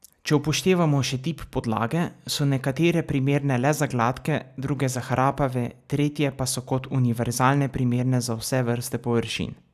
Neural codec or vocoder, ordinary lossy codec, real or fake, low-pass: none; none; real; 14.4 kHz